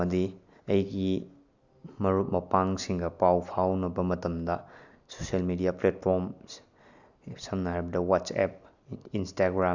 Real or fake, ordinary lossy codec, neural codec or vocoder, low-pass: real; none; none; 7.2 kHz